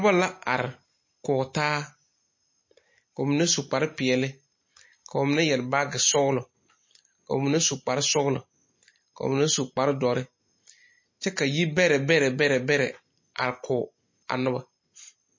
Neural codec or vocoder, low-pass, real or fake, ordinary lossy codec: none; 7.2 kHz; real; MP3, 32 kbps